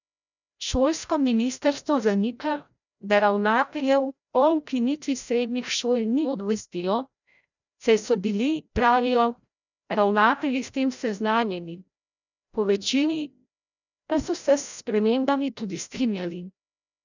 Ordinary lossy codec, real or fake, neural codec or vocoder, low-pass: none; fake; codec, 16 kHz, 0.5 kbps, FreqCodec, larger model; 7.2 kHz